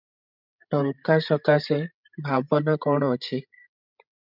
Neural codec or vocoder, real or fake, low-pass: codec, 16 kHz, 16 kbps, FreqCodec, larger model; fake; 5.4 kHz